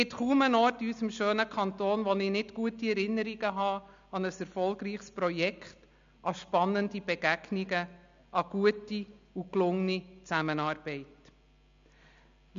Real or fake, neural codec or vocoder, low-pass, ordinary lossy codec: real; none; 7.2 kHz; MP3, 64 kbps